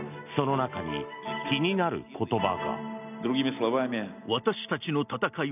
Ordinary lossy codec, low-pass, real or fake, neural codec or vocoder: none; 3.6 kHz; real; none